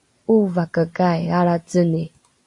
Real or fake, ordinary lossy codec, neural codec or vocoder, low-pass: real; AAC, 48 kbps; none; 10.8 kHz